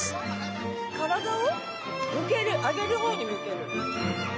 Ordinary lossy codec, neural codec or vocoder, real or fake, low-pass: none; none; real; none